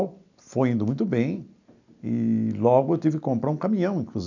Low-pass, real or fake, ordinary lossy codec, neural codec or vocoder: 7.2 kHz; real; none; none